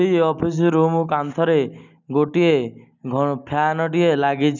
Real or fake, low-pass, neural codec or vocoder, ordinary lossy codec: real; 7.2 kHz; none; none